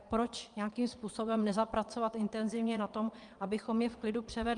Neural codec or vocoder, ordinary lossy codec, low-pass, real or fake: vocoder, 22.05 kHz, 80 mel bands, Vocos; Opus, 32 kbps; 9.9 kHz; fake